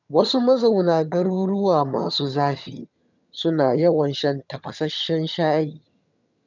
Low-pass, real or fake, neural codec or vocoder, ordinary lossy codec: 7.2 kHz; fake; vocoder, 22.05 kHz, 80 mel bands, HiFi-GAN; none